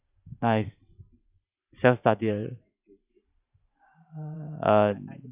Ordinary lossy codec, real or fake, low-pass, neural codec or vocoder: Opus, 64 kbps; fake; 3.6 kHz; vocoder, 44.1 kHz, 128 mel bands every 512 samples, BigVGAN v2